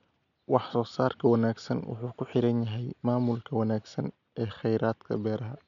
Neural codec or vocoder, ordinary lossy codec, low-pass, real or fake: none; none; 7.2 kHz; real